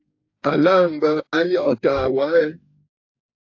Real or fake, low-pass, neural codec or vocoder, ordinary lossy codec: fake; 7.2 kHz; codec, 44.1 kHz, 2.6 kbps, DAC; AAC, 48 kbps